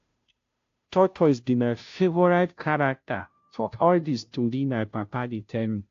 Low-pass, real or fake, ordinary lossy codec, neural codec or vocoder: 7.2 kHz; fake; AAC, 48 kbps; codec, 16 kHz, 0.5 kbps, FunCodec, trained on Chinese and English, 25 frames a second